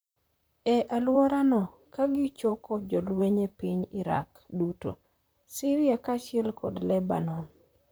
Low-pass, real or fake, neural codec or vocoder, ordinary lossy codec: none; fake; vocoder, 44.1 kHz, 128 mel bands, Pupu-Vocoder; none